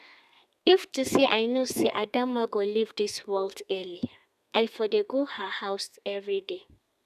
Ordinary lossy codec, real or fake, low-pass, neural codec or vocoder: none; fake; 14.4 kHz; codec, 32 kHz, 1.9 kbps, SNAC